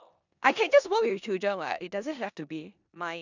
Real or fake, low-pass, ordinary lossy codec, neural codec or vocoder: fake; 7.2 kHz; none; codec, 16 kHz in and 24 kHz out, 0.9 kbps, LongCat-Audio-Codec, four codebook decoder